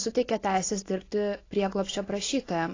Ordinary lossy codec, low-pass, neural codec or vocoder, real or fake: AAC, 32 kbps; 7.2 kHz; none; real